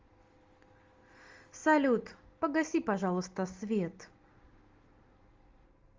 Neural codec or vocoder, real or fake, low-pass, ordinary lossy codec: none; real; 7.2 kHz; Opus, 32 kbps